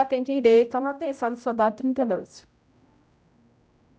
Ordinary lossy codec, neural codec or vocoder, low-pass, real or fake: none; codec, 16 kHz, 0.5 kbps, X-Codec, HuBERT features, trained on general audio; none; fake